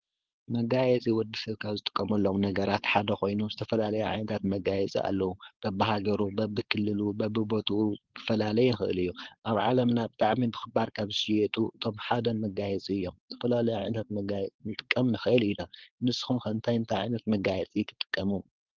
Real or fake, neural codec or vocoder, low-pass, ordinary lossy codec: fake; codec, 16 kHz, 4.8 kbps, FACodec; 7.2 kHz; Opus, 16 kbps